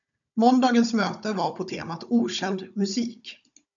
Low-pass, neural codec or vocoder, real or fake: 7.2 kHz; codec, 16 kHz, 16 kbps, FunCodec, trained on Chinese and English, 50 frames a second; fake